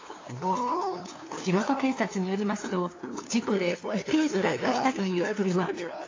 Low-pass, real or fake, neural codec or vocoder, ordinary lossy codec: 7.2 kHz; fake; codec, 16 kHz, 2 kbps, FunCodec, trained on LibriTTS, 25 frames a second; AAC, 32 kbps